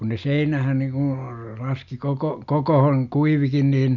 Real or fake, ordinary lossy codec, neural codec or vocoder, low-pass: real; none; none; 7.2 kHz